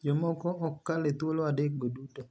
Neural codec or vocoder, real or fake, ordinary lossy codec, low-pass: none; real; none; none